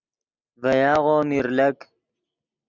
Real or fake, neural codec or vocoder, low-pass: real; none; 7.2 kHz